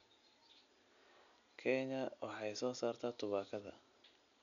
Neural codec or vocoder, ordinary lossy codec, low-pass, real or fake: none; none; 7.2 kHz; real